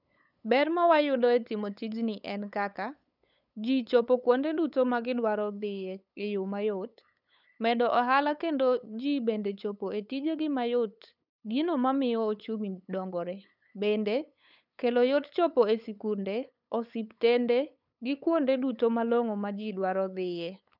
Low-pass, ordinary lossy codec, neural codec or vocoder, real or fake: 5.4 kHz; none; codec, 16 kHz, 8 kbps, FunCodec, trained on LibriTTS, 25 frames a second; fake